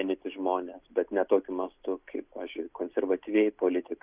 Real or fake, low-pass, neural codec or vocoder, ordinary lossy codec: real; 3.6 kHz; none; Opus, 64 kbps